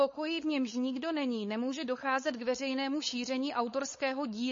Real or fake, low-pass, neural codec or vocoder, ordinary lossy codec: fake; 7.2 kHz; codec, 16 kHz, 4.8 kbps, FACodec; MP3, 32 kbps